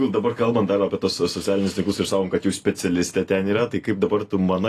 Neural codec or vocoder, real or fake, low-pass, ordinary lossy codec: none; real; 14.4 kHz; AAC, 48 kbps